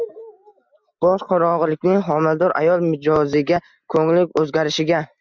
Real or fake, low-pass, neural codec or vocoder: real; 7.2 kHz; none